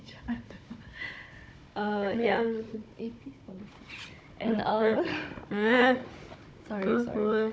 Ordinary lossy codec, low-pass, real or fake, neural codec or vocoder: none; none; fake; codec, 16 kHz, 16 kbps, FunCodec, trained on Chinese and English, 50 frames a second